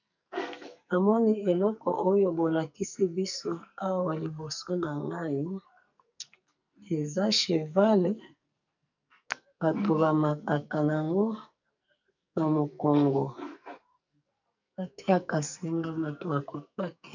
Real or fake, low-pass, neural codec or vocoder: fake; 7.2 kHz; codec, 44.1 kHz, 2.6 kbps, SNAC